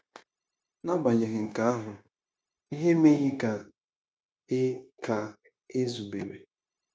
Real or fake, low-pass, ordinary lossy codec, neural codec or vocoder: fake; none; none; codec, 16 kHz, 0.9 kbps, LongCat-Audio-Codec